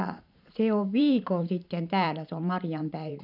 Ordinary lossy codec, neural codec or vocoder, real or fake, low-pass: none; vocoder, 44.1 kHz, 128 mel bands every 512 samples, BigVGAN v2; fake; 5.4 kHz